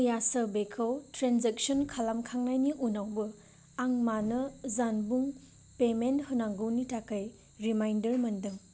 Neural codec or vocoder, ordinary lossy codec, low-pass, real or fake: none; none; none; real